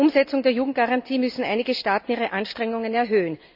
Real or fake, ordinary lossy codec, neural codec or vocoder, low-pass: real; none; none; 5.4 kHz